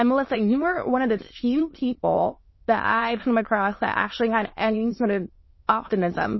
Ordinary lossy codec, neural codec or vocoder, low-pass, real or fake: MP3, 24 kbps; autoencoder, 22.05 kHz, a latent of 192 numbers a frame, VITS, trained on many speakers; 7.2 kHz; fake